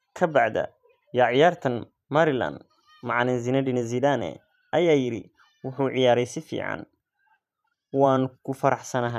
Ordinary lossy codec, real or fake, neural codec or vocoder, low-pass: none; fake; vocoder, 44.1 kHz, 128 mel bands every 512 samples, BigVGAN v2; 14.4 kHz